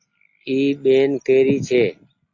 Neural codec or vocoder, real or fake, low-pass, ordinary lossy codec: none; real; 7.2 kHz; MP3, 48 kbps